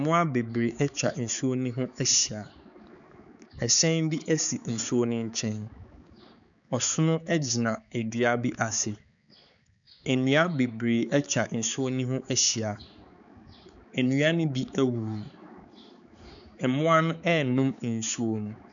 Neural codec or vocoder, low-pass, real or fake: codec, 16 kHz, 4 kbps, X-Codec, HuBERT features, trained on balanced general audio; 7.2 kHz; fake